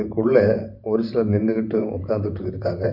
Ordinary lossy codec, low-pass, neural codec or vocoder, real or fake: none; 5.4 kHz; vocoder, 44.1 kHz, 128 mel bands, Pupu-Vocoder; fake